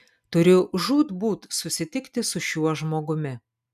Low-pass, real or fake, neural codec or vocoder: 14.4 kHz; real; none